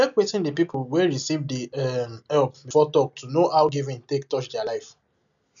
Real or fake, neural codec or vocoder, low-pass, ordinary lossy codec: real; none; 7.2 kHz; none